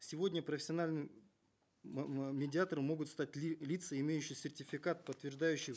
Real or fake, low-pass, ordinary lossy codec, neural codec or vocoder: real; none; none; none